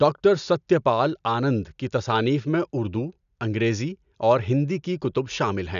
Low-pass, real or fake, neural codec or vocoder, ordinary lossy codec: 7.2 kHz; real; none; none